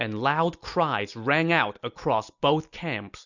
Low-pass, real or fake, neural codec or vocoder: 7.2 kHz; real; none